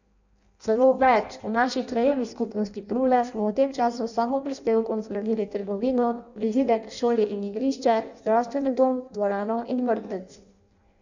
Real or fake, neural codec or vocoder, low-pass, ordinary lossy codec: fake; codec, 16 kHz in and 24 kHz out, 0.6 kbps, FireRedTTS-2 codec; 7.2 kHz; none